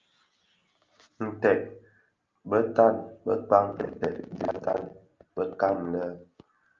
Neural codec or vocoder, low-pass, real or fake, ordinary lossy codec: none; 7.2 kHz; real; Opus, 24 kbps